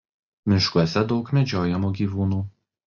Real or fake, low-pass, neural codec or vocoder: real; 7.2 kHz; none